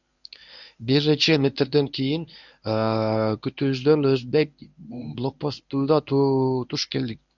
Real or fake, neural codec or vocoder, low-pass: fake; codec, 24 kHz, 0.9 kbps, WavTokenizer, medium speech release version 1; 7.2 kHz